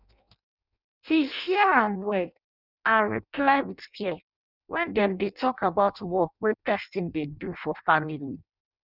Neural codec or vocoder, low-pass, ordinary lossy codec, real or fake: codec, 16 kHz in and 24 kHz out, 0.6 kbps, FireRedTTS-2 codec; 5.4 kHz; none; fake